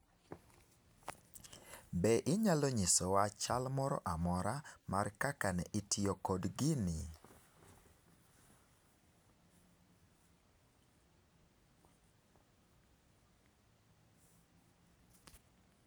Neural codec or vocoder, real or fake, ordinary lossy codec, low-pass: none; real; none; none